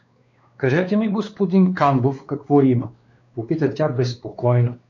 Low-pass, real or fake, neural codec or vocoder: 7.2 kHz; fake; codec, 16 kHz, 2 kbps, X-Codec, WavLM features, trained on Multilingual LibriSpeech